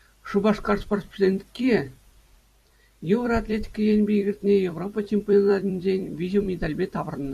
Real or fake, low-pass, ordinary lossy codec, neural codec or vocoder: fake; 14.4 kHz; MP3, 96 kbps; vocoder, 44.1 kHz, 128 mel bands every 256 samples, BigVGAN v2